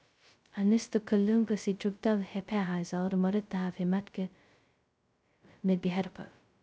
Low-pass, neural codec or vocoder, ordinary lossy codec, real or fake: none; codec, 16 kHz, 0.2 kbps, FocalCodec; none; fake